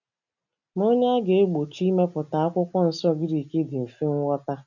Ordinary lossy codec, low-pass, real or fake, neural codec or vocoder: none; 7.2 kHz; real; none